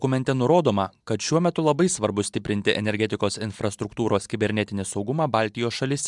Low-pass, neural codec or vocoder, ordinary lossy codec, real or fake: 10.8 kHz; none; MP3, 96 kbps; real